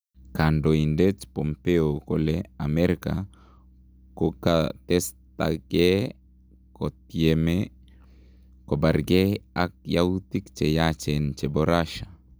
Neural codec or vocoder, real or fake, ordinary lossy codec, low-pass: none; real; none; none